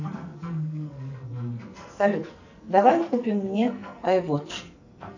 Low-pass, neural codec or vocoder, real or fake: 7.2 kHz; codec, 44.1 kHz, 2.6 kbps, SNAC; fake